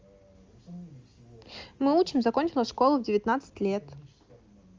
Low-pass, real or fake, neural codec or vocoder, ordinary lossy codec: 7.2 kHz; real; none; Opus, 32 kbps